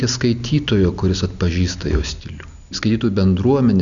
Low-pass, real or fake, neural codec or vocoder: 7.2 kHz; real; none